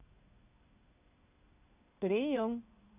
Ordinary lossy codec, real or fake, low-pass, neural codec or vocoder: none; fake; 3.6 kHz; codec, 16 kHz in and 24 kHz out, 1 kbps, XY-Tokenizer